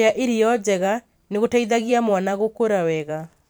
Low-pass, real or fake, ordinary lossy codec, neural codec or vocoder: none; fake; none; vocoder, 44.1 kHz, 128 mel bands every 512 samples, BigVGAN v2